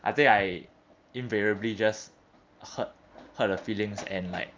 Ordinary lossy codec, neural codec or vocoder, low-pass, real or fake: Opus, 24 kbps; none; 7.2 kHz; real